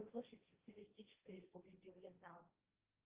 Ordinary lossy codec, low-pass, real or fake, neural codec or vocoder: Opus, 32 kbps; 3.6 kHz; fake; codec, 16 kHz, 1.1 kbps, Voila-Tokenizer